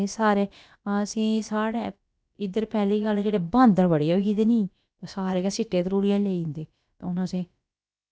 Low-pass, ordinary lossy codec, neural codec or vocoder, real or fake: none; none; codec, 16 kHz, about 1 kbps, DyCAST, with the encoder's durations; fake